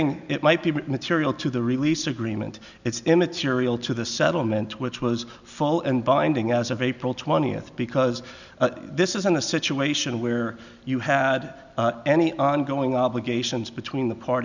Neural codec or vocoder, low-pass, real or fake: none; 7.2 kHz; real